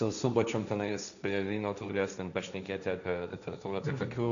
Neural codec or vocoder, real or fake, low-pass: codec, 16 kHz, 1.1 kbps, Voila-Tokenizer; fake; 7.2 kHz